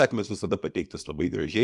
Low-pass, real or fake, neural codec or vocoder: 10.8 kHz; fake; codec, 24 kHz, 0.9 kbps, WavTokenizer, small release